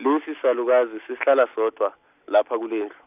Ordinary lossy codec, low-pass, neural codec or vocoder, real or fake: none; 3.6 kHz; none; real